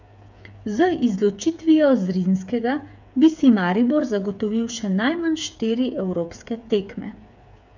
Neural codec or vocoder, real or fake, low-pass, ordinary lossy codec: codec, 16 kHz, 8 kbps, FreqCodec, smaller model; fake; 7.2 kHz; none